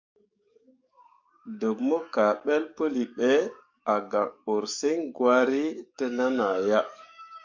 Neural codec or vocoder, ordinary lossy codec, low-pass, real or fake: codec, 44.1 kHz, 7.8 kbps, DAC; MP3, 64 kbps; 7.2 kHz; fake